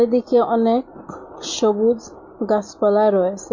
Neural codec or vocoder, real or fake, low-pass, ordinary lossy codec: none; real; 7.2 kHz; MP3, 48 kbps